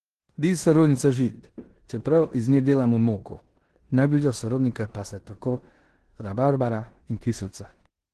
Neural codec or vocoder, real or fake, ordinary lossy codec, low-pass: codec, 16 kHz in and 24 kHz out, 0.9 kbps, LongCat-Audio-Codec, four codebook decoder; fake; Opus, 16 kbps; 10.8 kHz